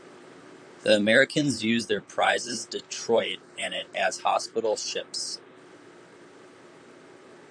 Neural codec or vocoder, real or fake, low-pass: vocoder, 44.1 kHz, 128 mel bands, Pupu-Vocoder; fake; 9.9 kHz